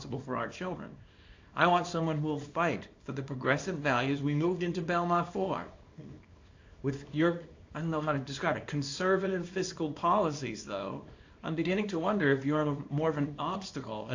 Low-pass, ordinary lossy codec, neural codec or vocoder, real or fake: 7.2 kHz; AAC, 48 kbps; codec, 24 kHz, 0.9 kbps, WavTokenizer, small release; fake